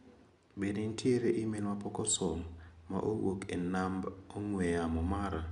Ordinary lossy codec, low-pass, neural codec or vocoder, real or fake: MP3, 96 kbps; 10.8 kHz; none; real